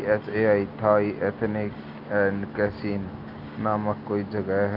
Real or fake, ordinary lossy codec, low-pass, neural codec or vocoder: real; Opus, 32 kbps; 5.4 kHz; none